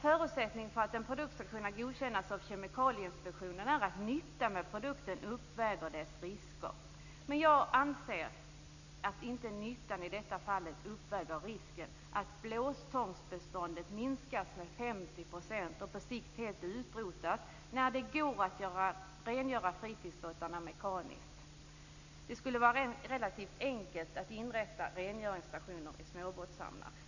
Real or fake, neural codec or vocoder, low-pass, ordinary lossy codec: real; none; 7.2 kHz; none